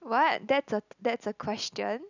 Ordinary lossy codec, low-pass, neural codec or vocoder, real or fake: none; 7.2 kHz; none; real